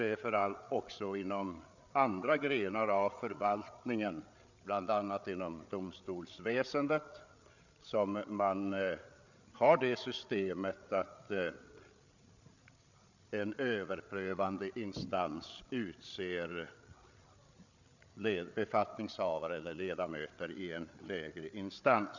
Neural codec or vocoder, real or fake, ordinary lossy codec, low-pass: codec, 16 kHz, 8 kbps, FreqCodec, larger model; fake; none; 7.2 kHz